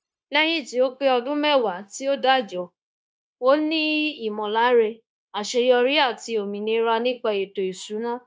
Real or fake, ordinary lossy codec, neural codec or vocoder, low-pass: fake; none; codec, 16 kHz, 0.9 kbps, LongCat-Audio-Codec; none